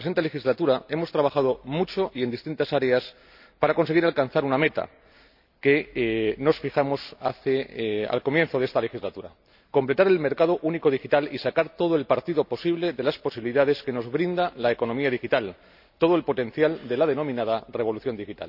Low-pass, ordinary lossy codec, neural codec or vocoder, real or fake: 5.4 kHz; none; none; real